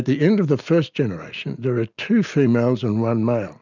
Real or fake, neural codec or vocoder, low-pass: real; none; 7.2 kHz